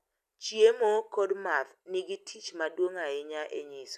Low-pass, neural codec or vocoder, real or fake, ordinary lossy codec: 14.4 kHz; none; real; none